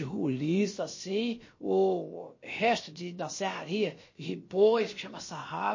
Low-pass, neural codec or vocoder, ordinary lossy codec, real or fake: 7.2 kHz; codec, 16 kHz, about 1 kbps, DyCAST, with the encoder's durations; MP3, 32 kbps; fake